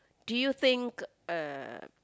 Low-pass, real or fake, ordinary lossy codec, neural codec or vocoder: none; real; none; none